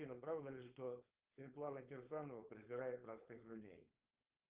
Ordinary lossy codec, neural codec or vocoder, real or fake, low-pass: Opus, 32 kbps; codec, 16 kHz, 4.8 kbps, FACodec; fake; 3.6 kHz